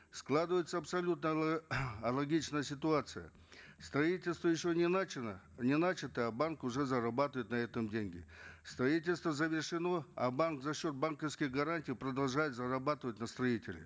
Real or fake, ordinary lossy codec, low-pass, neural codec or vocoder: real; none; none; none